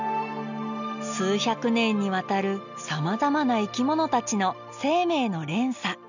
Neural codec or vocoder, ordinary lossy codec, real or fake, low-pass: none; none; real; 7.2 kHz